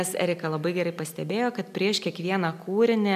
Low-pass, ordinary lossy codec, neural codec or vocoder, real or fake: 14.4 kHz; AAC, 96 kbps; none; real